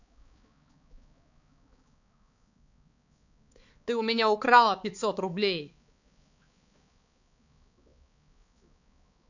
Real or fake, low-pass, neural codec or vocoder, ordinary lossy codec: fake; 7.2 kHz; codec, 16 kHz, 4 kbps, X-Codec, HuBERT features, trained on balanced general audio; none